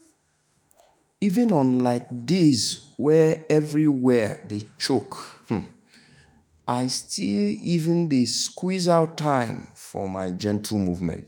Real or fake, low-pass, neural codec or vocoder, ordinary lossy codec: fake; none; autoencoder, 48 kHz, 32 numbers a frame, DAC-VAE, trained on Japanese speech; none